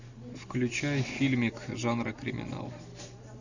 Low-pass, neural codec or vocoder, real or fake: 7.2 kHz; none; real